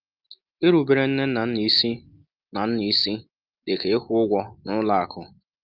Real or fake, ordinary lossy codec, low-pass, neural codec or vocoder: real; Opus, 24 kbps; 5.4 kHz; none